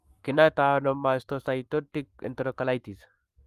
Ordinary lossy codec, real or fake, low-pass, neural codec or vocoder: Opus, 32 kbps; fake; 14.4 kHz; codec, 44.1 kHz, 7.8 kbps, Pupu-Codec